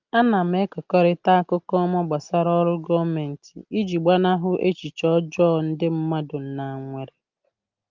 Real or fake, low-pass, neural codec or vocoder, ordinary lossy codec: real; 7.2 kHz; none; Opus, 32 kbps